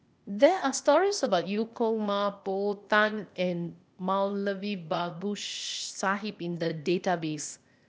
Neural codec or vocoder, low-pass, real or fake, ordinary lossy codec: codec, 16 kHz, 0.8 kbps, ZipCodec; none; fake; none